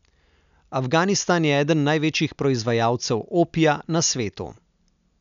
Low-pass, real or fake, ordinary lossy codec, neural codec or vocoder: 7.2 kHz; real; none; none